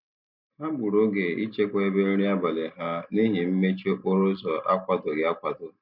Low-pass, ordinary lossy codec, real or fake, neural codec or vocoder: 5.4 kHz; none; real; none